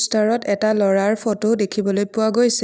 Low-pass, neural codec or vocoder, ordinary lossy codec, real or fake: none; none; none; real